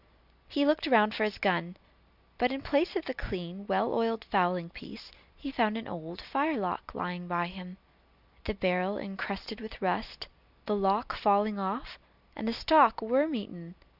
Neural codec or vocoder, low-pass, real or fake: none; 5.4 kHz; real